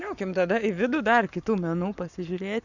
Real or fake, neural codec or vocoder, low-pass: fake; codec, 44.1 kHz, 7.8 kbps, Pupu-Codec; 7.2 kHz